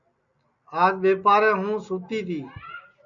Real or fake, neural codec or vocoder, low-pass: real; none; 7.2 kHz